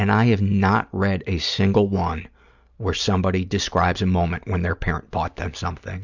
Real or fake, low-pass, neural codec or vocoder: real; 7.2 kHz; none